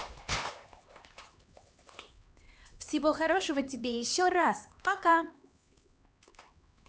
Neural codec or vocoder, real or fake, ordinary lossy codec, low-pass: codec, 16 kHz, 2 kbps, X-Codec, HuBERT features, trained on LibriSpeech; fake; none; none